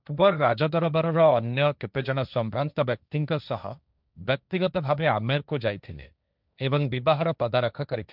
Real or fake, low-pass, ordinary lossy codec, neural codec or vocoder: fake; 5.4 kHz; none; codec, 16 kHz, 1.1 kbps, Voila-Tokenizer